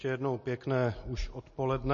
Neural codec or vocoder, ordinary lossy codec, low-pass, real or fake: none; MP3, 32 kbps; 7.2 kHz; real